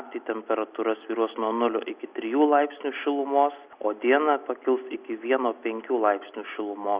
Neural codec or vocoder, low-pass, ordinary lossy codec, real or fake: none; 3.6 kHz; Opus, 64 kbps; real